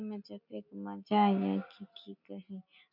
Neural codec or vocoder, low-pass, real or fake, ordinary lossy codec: none; 5.4 kHz; real; none